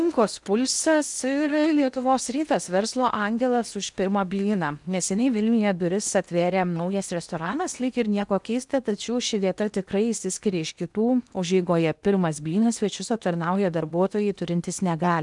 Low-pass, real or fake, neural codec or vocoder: 10.8 kHz; fake; codec, 16 kHz in and 24 kHz out, 0.8 kbps, FocalCodec, streaming, 65536 codes